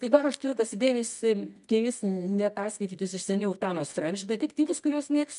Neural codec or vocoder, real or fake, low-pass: codec, 24 kHz, 0.9 kbps, WavTokenizer, medium music audio release; fake; 10.8 kHz